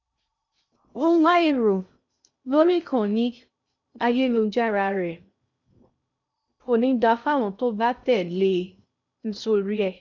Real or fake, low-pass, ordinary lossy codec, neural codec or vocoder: fake; 7.2 kHz; none; codec, 16 kHz in and 24 kHz out, 0.6 kbps, FocalCodec, streaming, 2048 codes